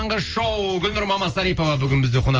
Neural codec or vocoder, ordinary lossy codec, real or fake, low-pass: none; Opus, 24 kbps; real; 7.2 kHz